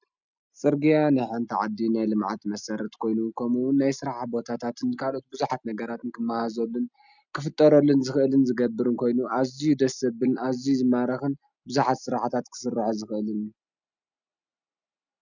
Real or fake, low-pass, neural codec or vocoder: real; 7.2 kHz; none